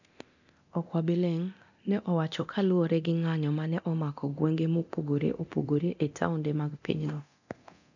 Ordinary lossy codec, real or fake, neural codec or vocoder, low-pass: none; fake; codec, 24 kHz, 0.9 kbps, DualCodec; 7.2 kHz